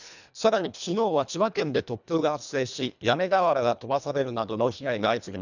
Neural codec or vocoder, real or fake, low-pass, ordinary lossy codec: codec, 24 kHz, 1.5 kbps, HILCodec; fake; 7.2 kHz; none